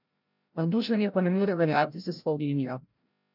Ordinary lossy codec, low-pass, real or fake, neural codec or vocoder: none; 5.4 kHz; fake; codec, 16 kHz, 0.5 kbps, FreqCodec, larger model